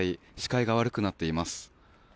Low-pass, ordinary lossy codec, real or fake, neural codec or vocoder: none; none; real; none